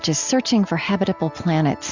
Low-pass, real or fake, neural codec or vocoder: 7.2 kHz; real; none